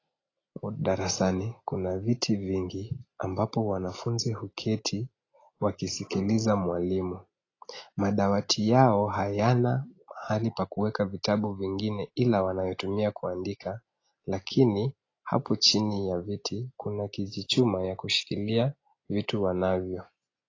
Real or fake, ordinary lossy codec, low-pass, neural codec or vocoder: real; AAC, 32 kbps; 7.2 kHz; none